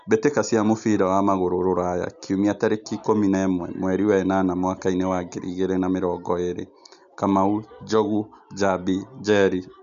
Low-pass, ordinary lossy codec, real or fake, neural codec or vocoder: 7.2 kHz; none; real; none